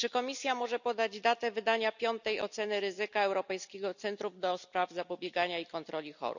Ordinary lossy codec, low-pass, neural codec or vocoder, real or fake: none; 7.2 kHz; none; real